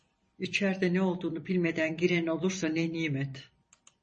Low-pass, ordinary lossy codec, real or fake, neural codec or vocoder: 10.8 kHz; MP3, 32 kbps; fake; vocoder, 44.1 kHz, 128 mel bands every 256 samples, BigVGAN v2